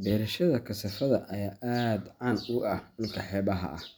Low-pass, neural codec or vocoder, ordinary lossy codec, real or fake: none; none; none; real